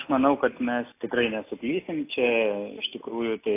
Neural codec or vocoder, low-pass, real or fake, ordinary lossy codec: none; 3.6 kHz; real; AAC, 24 kbps